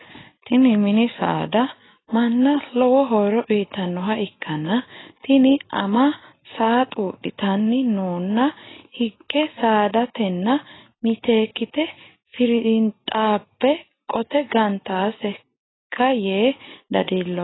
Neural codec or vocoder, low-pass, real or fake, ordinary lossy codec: none; 7.2 kHz; real; AAC, 16 kbps